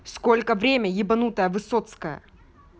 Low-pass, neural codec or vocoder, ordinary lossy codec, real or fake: none; none; none; real